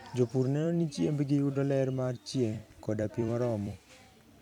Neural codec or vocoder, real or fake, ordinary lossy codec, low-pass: vocoder, 44.1 kHz, 128 mel bands every 256 samples, BigVGAN v2; fake; none; 19.8 kHz